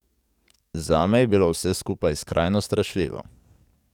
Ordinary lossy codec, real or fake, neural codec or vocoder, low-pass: none; fake; codec, 44.1 kHz, 7.8 kbps, DAC; 19.8 kHz